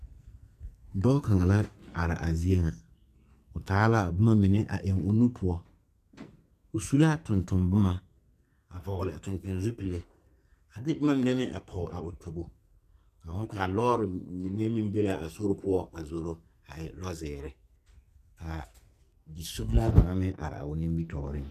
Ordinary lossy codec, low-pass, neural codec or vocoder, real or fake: MP3, 96 kbps; 14.4 kHz; codec, 44.1 kHz, 2.6 kbps, SNAC; fake